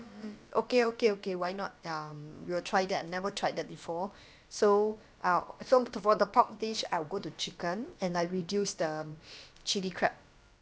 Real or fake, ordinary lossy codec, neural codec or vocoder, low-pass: fake; none; codec, 16 kHz, about 1 kbps, DyCAST, with the encoder's durations; none